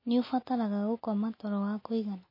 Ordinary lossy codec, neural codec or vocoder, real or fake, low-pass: MP3, 24 kbps; none; real; 5.4 kHz